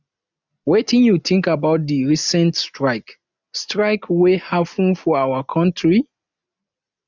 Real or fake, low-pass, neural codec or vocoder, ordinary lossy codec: real; 7.2 kHz; none; none